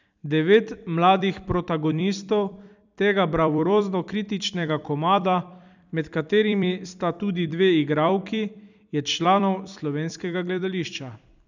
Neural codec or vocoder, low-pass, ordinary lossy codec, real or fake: vocoder, 44.1 kHz, 128 mel bands every 256 samples, BigVGAN v2; 7.2 kHz; none; fake